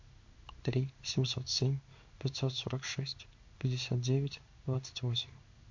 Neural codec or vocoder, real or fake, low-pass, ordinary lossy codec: codec, 16 kHz in and 24 kHz out, 1 kbps, XY-Tokenizer; fake; 7.2 kHz; MP3, 48 kbps